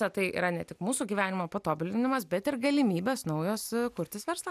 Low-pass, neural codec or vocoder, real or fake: 14.4 kHz; none; real